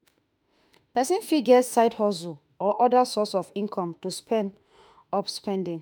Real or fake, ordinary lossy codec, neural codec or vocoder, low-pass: fake; none; autoencoder, 48 kHz, 32 numbers a frame, DAC-VAE, trained on Japanese speech; none